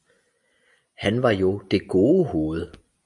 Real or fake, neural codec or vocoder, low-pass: real; none; 10.8 kHz